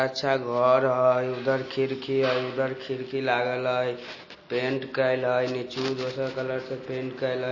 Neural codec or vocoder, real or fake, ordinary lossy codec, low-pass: none; real; MP3, 32 kbps; 7.2 kHz